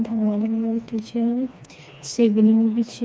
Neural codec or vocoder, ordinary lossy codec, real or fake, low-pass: codec, 16 kHz, 2 kbps, FreqCodec, smaller model; none; fake; none